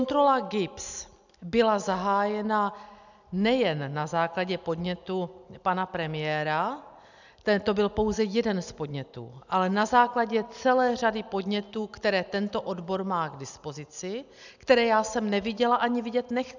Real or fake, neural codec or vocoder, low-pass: real; none; 7.2 kHz